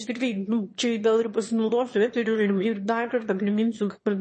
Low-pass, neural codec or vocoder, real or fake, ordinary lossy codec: 9.9 kHz; autoencoder, 22.05 kHz, a latent of 192 numbers a frame, VITS, trained on one speaker; fake; MP3, 32 kbps